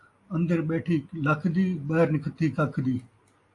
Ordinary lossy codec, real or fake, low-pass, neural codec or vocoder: AAC, 48 kbps; real; 10.8 kHz; none